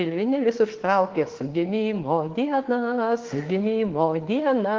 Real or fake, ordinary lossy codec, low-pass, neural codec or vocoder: fake; Opus, 16 kbps; 7.2 kHz; autoencoder, 48 kHz, 32 numbers a frame, DAC-VAE, trained on Japanese speech